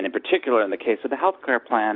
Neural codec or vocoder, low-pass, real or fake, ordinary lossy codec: none; 5.4 kHz; real; AAC, 32 kbps